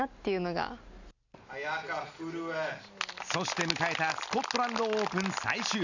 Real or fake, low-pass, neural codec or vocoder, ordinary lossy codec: real; 7.2 kHz; none; none